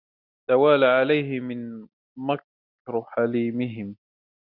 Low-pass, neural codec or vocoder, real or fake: 5.4 kHz; none; real